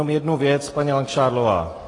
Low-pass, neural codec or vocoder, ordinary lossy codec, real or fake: 10.8 kHz; none; AAC, 32 kbps; real